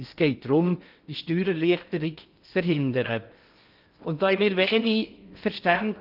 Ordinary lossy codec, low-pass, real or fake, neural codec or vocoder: Opus, 32 kbps; 5.4 kHz; fake; codec, 16 kHz in and 24 kHz out, 0.6 kbps, FocalCodec, streaming, 2048 codes